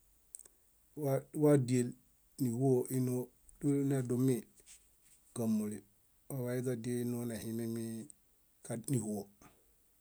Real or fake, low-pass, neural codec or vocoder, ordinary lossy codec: real; none; none; none